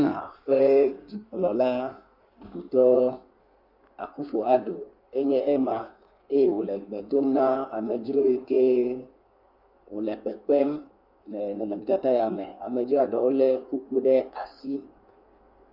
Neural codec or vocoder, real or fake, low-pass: codec, 16 kHz in and 24 kHz out, 1.1 kbps, FireRedTTS-2 codec; fake; 5.4 kHz